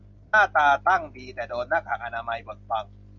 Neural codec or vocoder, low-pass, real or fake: none; 7.2 kHz; real